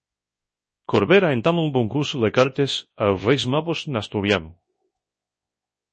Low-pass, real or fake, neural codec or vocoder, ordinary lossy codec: 10.8 kHz; fake; codec, 24 kHz, 0.9 kbps, WavTokenizer, large speech release; MP3, 32 kbps